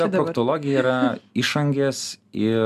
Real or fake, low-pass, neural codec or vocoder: real; 14.4 kHz; none